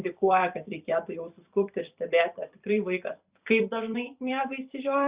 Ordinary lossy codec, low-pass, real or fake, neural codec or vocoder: Opus, 64 kbps; 3.6 kHz; fake; vocoder, 44.1 kHz, 128 mel bands every 256 samples, BigVGAN v2